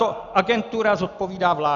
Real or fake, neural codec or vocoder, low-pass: real; none; 7.2 kHz